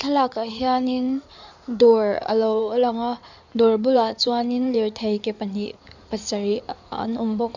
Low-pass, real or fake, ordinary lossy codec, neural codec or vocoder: 7.2 kHz; fake; none; codec, 16 kHz in and 24 kHz out, 2.2 kbps, FireRedTTS-2 codec